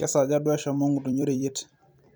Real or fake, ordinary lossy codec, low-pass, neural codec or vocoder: fake; none; none; vocoder, 44.1 kHz, 128 mel bands every 256 samples, BigVGAN v2